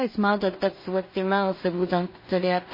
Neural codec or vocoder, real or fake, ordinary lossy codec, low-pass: codec, 16 kHz in and 24 kHz out, 0.4 kbps, LongCat-Audio-Codec, two codebook decoder; fake; MP3, 24 kbps; 5.4 kHz